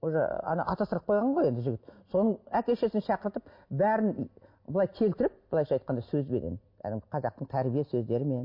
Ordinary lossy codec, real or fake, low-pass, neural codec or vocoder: MP3, 32 kbps; real; 5.4 kHz; none